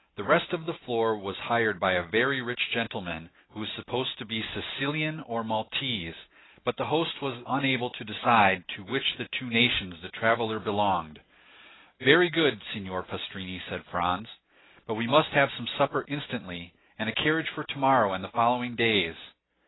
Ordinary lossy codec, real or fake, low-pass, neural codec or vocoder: AAC, 16 kbps; real; 7.2 kHz; none